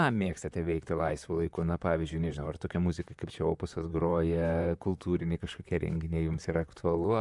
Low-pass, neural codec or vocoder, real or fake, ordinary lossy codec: 10.8 kHz; vocoder, 44.1 kHz, 128 mel bands, Pupu-Vocoder; fake; MP3, 96 kbps